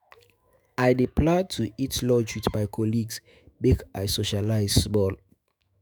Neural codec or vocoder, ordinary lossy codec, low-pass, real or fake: autoencoder, 48 kHz, 128 numbers a frame, DAC-VAE, trained on Japanese speech; none; none; fake